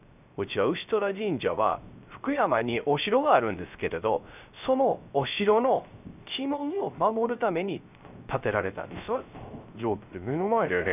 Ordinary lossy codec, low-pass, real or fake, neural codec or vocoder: none; 3.6 kHz; fake; codec, 16 kHz, 0.3 kbps, FocalCodec